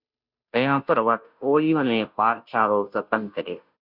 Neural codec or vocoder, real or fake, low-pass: codec, 16 kHz, 0.5 kbps, FunCodec, trained on Chinese and English, 25 frames a second; fake; 5.4 kHz